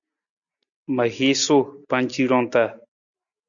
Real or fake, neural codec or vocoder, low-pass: real; none; 7.2 kHz